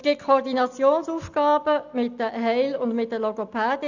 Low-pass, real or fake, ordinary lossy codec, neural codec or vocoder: 7.2 kHz; real; none; none